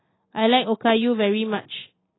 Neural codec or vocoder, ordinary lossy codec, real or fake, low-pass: none; AAC, 16 kbps; real; 7.2 kHz